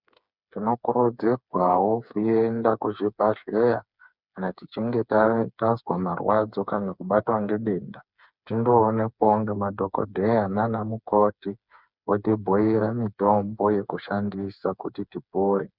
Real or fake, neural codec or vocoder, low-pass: fake; codec, 16 kHz, 4 kbps, FreqCodec, smaller model; 5.4 kHz